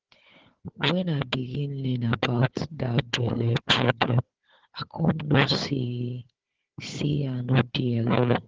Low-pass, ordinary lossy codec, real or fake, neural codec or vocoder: 7.2 kHz; Opus, 16 kbps; fake; codec, 16 kHz, 4 kbps, FunCodec, trained on Chinese and English, 50 frames a second